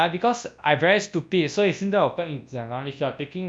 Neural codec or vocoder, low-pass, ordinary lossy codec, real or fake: codec, 24 kHz, 0.9 kbps, WavTokenizer, large speech release; 9.9 kHz; none; fake